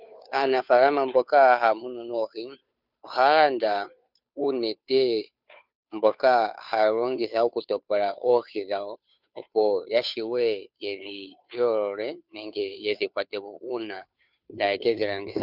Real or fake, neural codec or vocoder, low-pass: fake; codec, 16 kHz, 2 kbps, FunCodec, trained on Chinese and English, 25 frames a second; 5.4 kHz